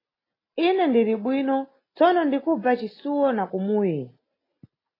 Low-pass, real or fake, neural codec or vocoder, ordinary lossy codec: 5.4 kHz; real; none; AAC, 24 kbps